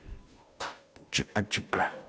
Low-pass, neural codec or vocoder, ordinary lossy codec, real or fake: none; codec, 16 kHz, 0.5 kbps, FunCodec, trained on Chinese and English, 25 frames a second; none; fake